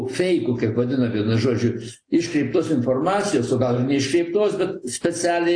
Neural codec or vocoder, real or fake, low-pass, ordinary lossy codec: none; real; 9.9 kHz; AAC, 48 kbps